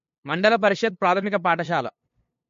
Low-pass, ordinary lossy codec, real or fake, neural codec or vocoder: 7.2 kHz; MP3, 48 kbps; fake; codec, 16 kHz, 2 kbps, FunCodec, trained on LibriTTS, 25 frames a second